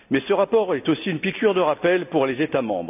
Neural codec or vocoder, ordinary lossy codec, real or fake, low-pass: none; none; real; 3.6 kHz